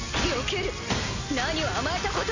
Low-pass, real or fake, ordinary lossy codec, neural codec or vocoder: 7.2 kHz; real; Opus, 64 kbps; none